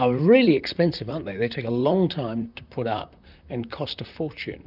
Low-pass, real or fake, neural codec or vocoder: 5.4 kHz; fake; vocoder, 44.1 kHz, 128 mel bands, Pupu-Vocoder